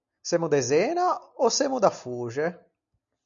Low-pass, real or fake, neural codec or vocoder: 7.2 kHz; real; none